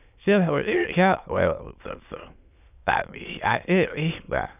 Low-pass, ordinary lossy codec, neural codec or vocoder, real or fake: 3.6 kHz; none; autoencoder, 22.05 kHz, a latent of 192 numbers a frame, VITS, trained on many speakers; fake